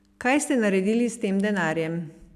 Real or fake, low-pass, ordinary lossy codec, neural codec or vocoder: real; 14.4 kHz; AAC, 96 kbps; none